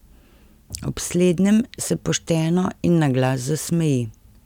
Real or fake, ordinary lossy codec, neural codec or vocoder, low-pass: real; none; none; 19.8 kHz